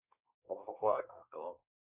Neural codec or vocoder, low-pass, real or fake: codec, 24 kHz, 1 kbps, SNAC; 3.6 kHz; fake